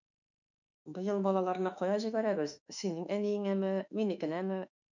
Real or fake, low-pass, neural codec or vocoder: fake; 7.2 kHz; autoencoder, 48 kHz, 32 numbers a frame, DAC-VAE, trained on Japanese speech